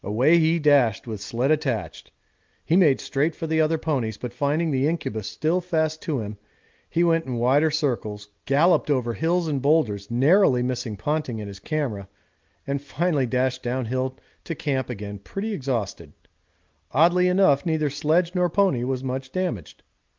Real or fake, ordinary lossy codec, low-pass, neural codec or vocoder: real; Opus, 32 kbps; 7.2 kHz; none